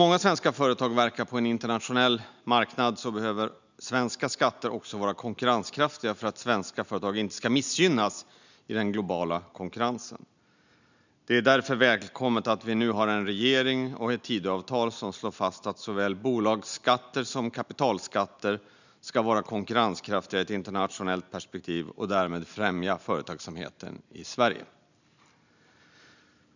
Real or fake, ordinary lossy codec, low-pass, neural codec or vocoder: real; none; 7.2 kHz; none